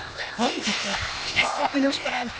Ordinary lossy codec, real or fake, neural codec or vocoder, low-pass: none; fake; codec, 16 kHz, 0.8 kbps, ZipCodec; none